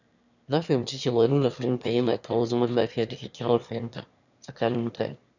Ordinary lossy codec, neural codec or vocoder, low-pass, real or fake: none; autoencoder, 22.05 kHz, a latent of 192 numbers a frame, VITS, trained on one speaker; 7.2 kHz; fake